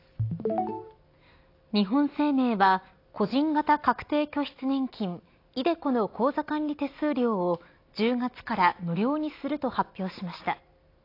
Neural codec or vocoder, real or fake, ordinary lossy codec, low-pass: none; real; AAC, 32 kbps; 5.4 kHz